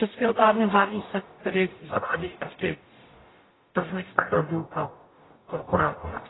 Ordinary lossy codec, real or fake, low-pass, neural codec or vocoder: AAC, 16 kbps; fake; 7.2 kHz; codec, 44.1 kHz, 0.9 kbps, DAC